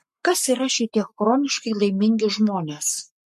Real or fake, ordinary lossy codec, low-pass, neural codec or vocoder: fake; MP3, 64 kbps; 14.4 kHz; codec, 44.1 kHz, 7.8 kbps, Pupu-Codec